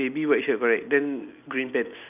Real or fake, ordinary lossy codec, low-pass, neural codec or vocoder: real; none; 3.6 kHz; none